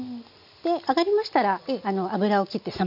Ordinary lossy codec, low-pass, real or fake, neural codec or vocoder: none; 5.4 kHz; real; none